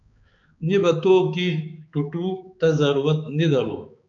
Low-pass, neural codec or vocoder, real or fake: 7.2 kHz; codec, 16 kHz, 4 kbps, X-Codec, HuBERT features, trained on balanced general audio; fake